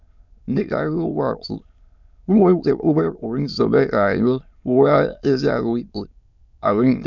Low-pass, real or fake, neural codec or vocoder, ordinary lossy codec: 7.2 kHz; fake; autoencoder, 22.05 kHz, a latent of 192 numbers a frame, VITS, trained on many speakers; none